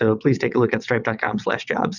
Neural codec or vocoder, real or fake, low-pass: vocoder, 22.05 kHz, 80 mel bands, WaveNeXt; fake; 7.2 kHz